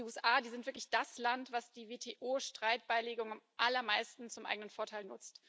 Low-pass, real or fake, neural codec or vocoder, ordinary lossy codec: none; real; none; none